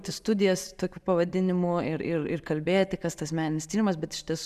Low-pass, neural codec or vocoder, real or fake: 14.4 kHz; none; real